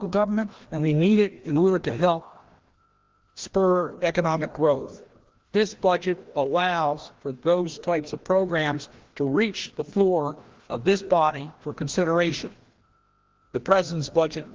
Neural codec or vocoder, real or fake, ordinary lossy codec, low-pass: codec, 16 kHz, 1 kbps, FreqCodec, larger model; fake; Opus, 16 kbps; 7.2 kHz